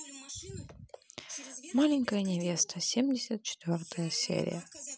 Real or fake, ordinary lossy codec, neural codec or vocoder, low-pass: real; none; none; none